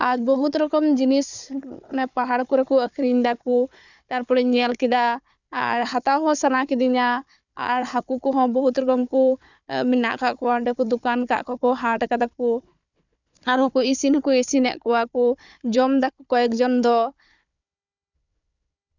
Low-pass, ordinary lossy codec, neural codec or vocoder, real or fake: 7.2 kHz; none; codec, 16 kHz, 4 kbps, FunCodec, trained on Chinese and English, 50 frames a second; fake